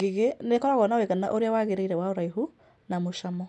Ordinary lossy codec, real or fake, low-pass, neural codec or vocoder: none; real; none; none